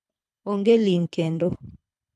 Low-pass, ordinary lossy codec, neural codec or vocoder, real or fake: none; none; codec, 24 kHz, 3 kbps, HILCodec; fake